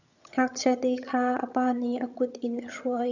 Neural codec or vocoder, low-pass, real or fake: vocoder, 22.05 kHz, 80 mel bands, HiFi-GAN; 7.2 kHz; fake